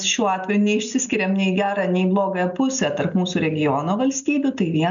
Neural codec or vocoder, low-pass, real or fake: none; 7.2 kHz; real